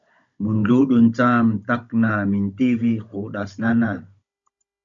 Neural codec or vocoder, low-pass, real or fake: codec, 16 kHz, 16 kbps, FunCodec, trained on Chinese and English, 50 frames a second; 7.2 kHz; fake